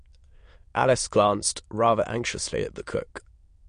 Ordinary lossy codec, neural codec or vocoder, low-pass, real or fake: MP3, 48 kbps; autoencoder, 22.05 kHz, a latent of 192 numbers a frame, VITS, trained on many speakers; 9.9 kHz; fake